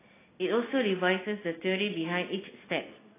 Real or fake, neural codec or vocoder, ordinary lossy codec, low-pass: real; none; AAC, 16 kbps; 3.6 kHz